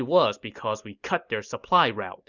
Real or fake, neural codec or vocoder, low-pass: real; none; 7.2 kHz